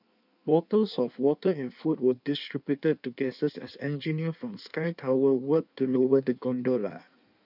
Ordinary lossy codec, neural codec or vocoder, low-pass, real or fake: none; codec, 16 kHz in and 24 kHz out, 1.1 kbps, FireRedTTS-2 codec; 5.4 kHz; fake